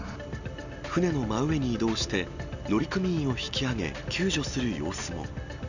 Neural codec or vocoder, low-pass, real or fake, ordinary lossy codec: none; 7.2 kHz; real; none